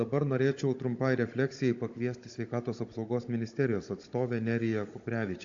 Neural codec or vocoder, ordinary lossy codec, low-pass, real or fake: codec, 16 kHz, 16 kbps, FunCodec, trained on Chinese and English, 50 frames a second; AAC, 48 kbps; 7.2 kHz; fake